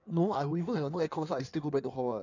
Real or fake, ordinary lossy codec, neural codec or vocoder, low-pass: fake; none; codec, 16 kHz in and 24 kHz out, 1.1 kbps, FireRedTTS-2 codec; 7.2 kHz